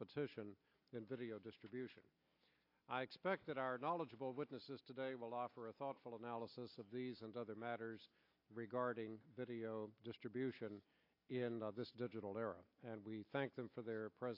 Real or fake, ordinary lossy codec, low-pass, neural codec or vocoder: real; AAC, 32 kbps; 5.4 kHz; none